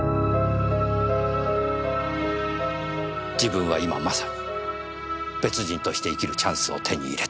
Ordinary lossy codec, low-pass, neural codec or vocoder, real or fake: none; none; none; real